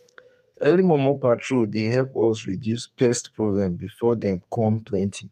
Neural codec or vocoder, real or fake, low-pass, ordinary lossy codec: codec, 32 kHz, 1.9 kbps, SNAC; fake; 14.4 kHz; MP3, 96 kbps